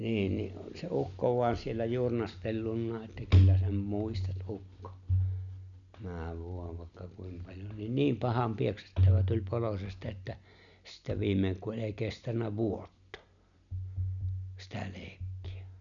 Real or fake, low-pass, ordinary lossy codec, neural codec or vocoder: real; 7.2 kHz; none; none